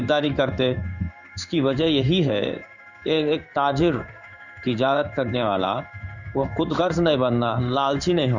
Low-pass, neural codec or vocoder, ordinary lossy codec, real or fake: 7.2 kHz; codec, 16 kHz in and 24 kHz out, 1 kbps, XY-Tokenizer; none; fake